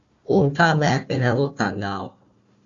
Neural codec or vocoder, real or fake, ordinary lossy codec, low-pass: codec, 16 kHz, 1 kbps, FunCodec, trained on Chinese and English, 50 frames a second; fake; Opus, 64 kbps; 7.2 kHz